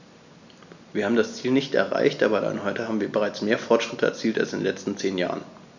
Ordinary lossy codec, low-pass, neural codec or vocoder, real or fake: none; 7.2 kHz; none; real